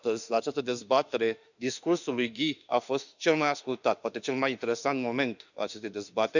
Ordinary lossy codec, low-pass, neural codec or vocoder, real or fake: none; 7.2 kHz; autoencoder, 48 kHz, 32 numbers a frame, DAC-VAE, trained on Japanese speech; fake